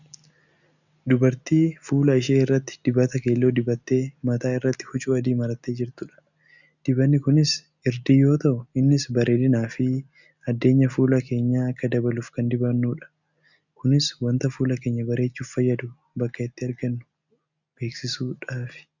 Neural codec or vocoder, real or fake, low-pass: none; real; 7.2 kHz